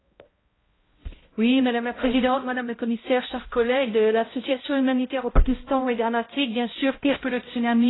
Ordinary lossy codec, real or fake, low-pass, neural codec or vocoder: AAC, 16 kbps; fake; 7.2 kHz; codec, 16 kHz, 0.5 kbps, X-Codec, HuBERT features, trained on balanced general audio